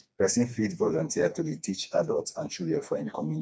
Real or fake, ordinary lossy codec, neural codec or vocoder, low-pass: fake; none; codec, 16 kHz, 2 kbps, FreqCodec, smaller model; none